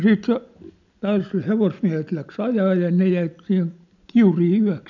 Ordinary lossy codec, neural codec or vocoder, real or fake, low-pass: none; none; real; 7.2 kHz